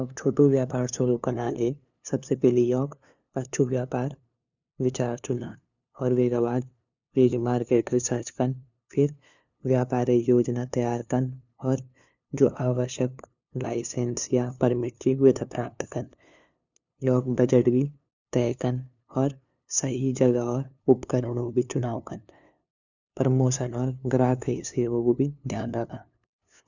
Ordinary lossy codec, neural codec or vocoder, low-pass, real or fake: none; codec, 16 kHz, 2 kbps, FunCodec, trained on LibriTTS, 25 frames a second; 7.2 kHz; fake